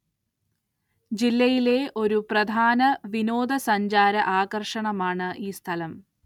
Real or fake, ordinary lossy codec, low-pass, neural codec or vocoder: real; none; 19.8 kHz; none